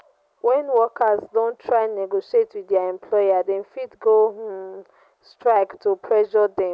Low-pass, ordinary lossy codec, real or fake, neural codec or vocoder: none; none; real; none